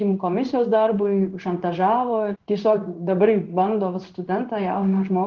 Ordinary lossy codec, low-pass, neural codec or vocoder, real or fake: Opus, 16 kbps; 7.2 kHz; codec, 16 kHz in and 24 kHz out, 1 kbps, XY-Tokenizer; fake